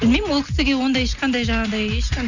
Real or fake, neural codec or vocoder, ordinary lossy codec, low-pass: real; none; none; 7.2 kHz